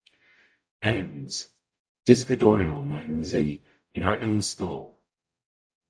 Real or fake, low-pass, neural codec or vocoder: fake; 9.9 kHz; codec, 44.1 kHz, 0.9 kbps, DAC